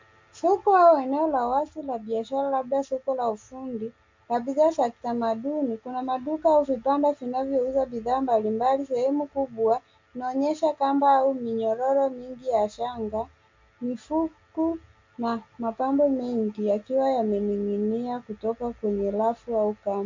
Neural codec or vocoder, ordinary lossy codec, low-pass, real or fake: none; MP3, 64 kbps; 7.2 kHz; real